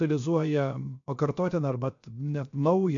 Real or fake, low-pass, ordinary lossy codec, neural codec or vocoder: fake; 7.2 kHz; AAC, 64 kbps; codec, 16 kHz, 0.7 kbps, FocalCodec